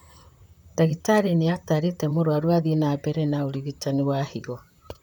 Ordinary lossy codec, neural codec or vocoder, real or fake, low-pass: none; vocoder, 44.1 kHz, 128 mel bands, Pupu-Vocoder; fake; none